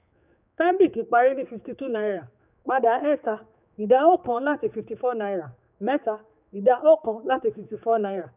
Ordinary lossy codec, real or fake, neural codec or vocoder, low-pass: none; fake; codec, 16 kHz, 4 kbps, X-Codec, HuBERT features, trained on general audio; 3.6 kHz